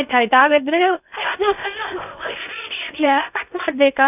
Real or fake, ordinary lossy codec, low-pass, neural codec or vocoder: fake; none; 3.6 kHz; codec, 16 kHz in and 24 kHz out, 0.8 kbps, FocalCodec, streaming, 65536 codes